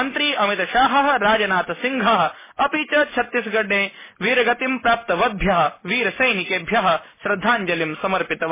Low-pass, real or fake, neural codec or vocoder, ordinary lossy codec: 3.6 kHz; real; none; MP3, 16 kbps